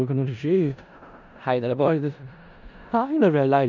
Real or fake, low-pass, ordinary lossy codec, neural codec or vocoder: fake; 7.2 kHz; none; codec, 16 kHz in and 24 kHz out, 0.4 kbps, LongCat-Audio-Codec, four codebook decoder